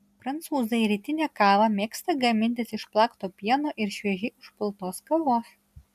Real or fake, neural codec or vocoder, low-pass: real; none; 14.4 kHz